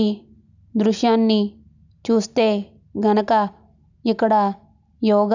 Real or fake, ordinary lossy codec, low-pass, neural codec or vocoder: real; none; 7.2 kHz; none